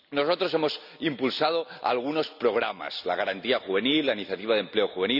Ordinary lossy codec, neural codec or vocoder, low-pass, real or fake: none; none; 5.4 kHz; real